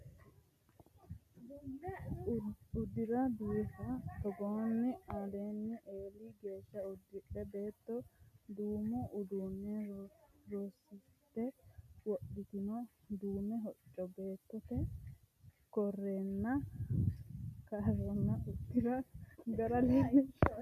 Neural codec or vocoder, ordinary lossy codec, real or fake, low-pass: none; MP3, 96 kbps; real; 14.4 kHz